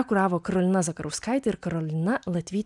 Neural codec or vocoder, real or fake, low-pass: none; real; 10.8 kHz